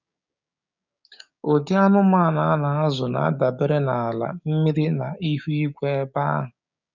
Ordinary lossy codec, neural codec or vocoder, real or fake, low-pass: none; codec, 16 kHz, 6 kbps, DAC; fake; 7.2 kHz